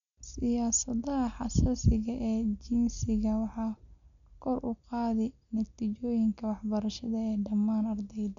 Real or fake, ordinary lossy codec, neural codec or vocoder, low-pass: real; none; none; 7.2 kHz